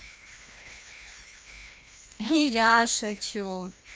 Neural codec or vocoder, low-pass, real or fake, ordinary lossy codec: codec, 16 kHz, 1 kbps, FreqCodec, larger model; none; fake; none